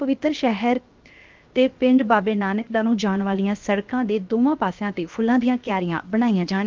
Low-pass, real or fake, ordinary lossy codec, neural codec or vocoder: 7.2 kHz; fake; Opus, 32 kbps; codec, 16 kHz, 0.7 kbps, FocalCodec